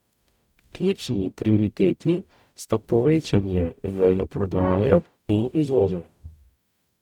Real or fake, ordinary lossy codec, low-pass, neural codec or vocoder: fake; none; 19.8 kHz; codec, 44.1 kHz, 0.9 kbps, DAC